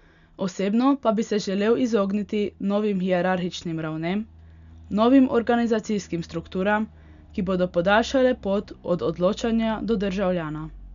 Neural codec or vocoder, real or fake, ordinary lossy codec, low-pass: none; real; none; 7.2 kHz